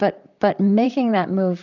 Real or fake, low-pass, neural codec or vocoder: fake; 7.2 kHz; vocoder, 44.1 kHz, 128 mel bands, Pupu-Vocoder